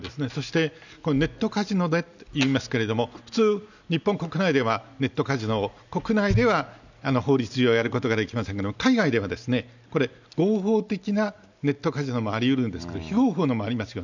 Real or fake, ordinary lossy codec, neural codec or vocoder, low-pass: real; none; none; 7.2 kHz